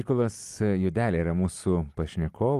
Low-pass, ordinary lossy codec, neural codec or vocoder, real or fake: 14.4 kHz; Opus, 32 kbps; none; real